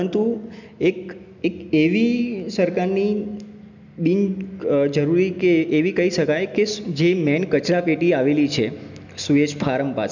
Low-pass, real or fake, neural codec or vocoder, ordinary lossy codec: 7.2 kHz; real; none; none